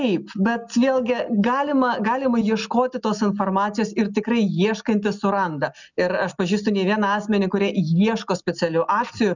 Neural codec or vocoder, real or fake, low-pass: none; real; 7.2 kHz